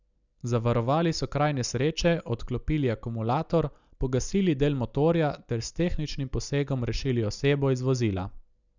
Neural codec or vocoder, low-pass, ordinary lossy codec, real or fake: none; 7.2 kHz; none; real